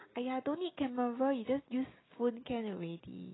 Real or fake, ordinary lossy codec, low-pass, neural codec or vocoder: real; AAC, 16 kbps; 7.2 kHz; none